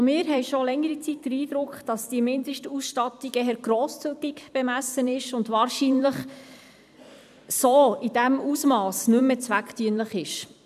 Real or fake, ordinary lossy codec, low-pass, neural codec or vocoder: fake; none; 14.4 kHz; vocoder, 44.1 kHz, 128 mel bands every 256 samples, BigVGAN v2